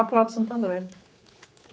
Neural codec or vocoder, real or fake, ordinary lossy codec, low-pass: codec, 16 kHz, 4 kbps, X-Codec, HuBERT features, trained on general audio; fake; none; none